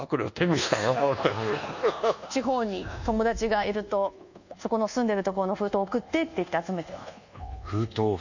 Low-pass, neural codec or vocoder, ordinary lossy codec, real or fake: 7.2 kHz; codec, 24 kHz, 1.2 kbps, DualCodec; none; fake